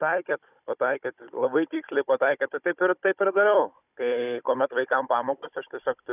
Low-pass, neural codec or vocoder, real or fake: 3.6 kHz; codec, 16 kHz, 16 kbps, FunCodec, trained on Chinese and English, 50 frames a second; fake